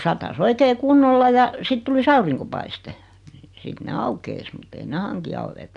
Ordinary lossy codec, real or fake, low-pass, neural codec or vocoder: none; real; 10.8 kHz; none